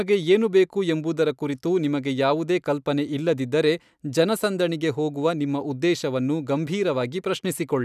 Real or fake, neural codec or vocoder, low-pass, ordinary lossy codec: real; none; 14.4 kHz; none